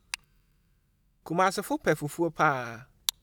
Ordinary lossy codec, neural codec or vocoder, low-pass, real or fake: none; none; none; real